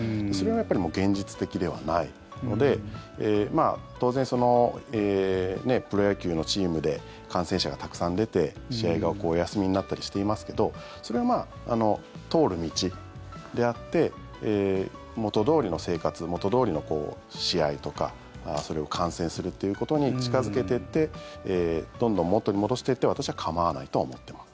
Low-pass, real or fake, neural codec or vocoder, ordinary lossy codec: none; real; none; none